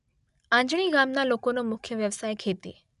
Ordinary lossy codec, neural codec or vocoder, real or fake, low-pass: none; none; real; 10.8 kHz